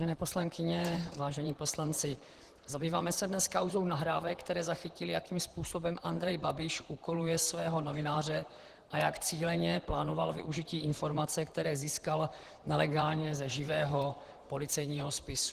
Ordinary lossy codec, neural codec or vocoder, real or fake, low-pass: Opus, 16 kbps; vocoder, 44.1 kHz, 128 mel bands, Pupu-Vocoder; fake; 14.4 kHz